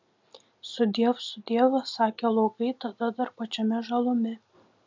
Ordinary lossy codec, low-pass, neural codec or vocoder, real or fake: MP3, 64 kbps; 7.2 kHz; none; real